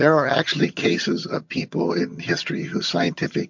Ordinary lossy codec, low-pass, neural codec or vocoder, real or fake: MP3, 48 kbps; 7.2 kHz; vocoder, 22.05 kHz, 80 mel bands, HiFi-GAN; fake